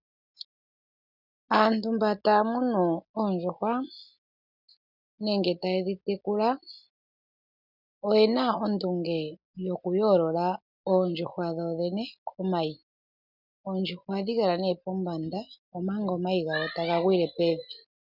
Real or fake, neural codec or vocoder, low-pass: real; none; 5.4 kHz